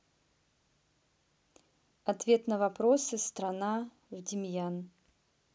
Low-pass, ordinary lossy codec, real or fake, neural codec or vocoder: none; none; real; none